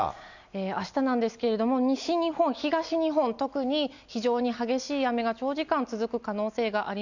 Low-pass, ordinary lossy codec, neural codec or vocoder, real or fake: 7.2 kHz; none; none; real